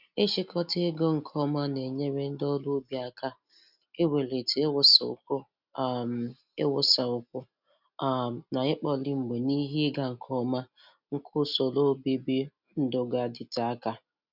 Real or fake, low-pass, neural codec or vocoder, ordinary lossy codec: real; 5.4 kHz; none; none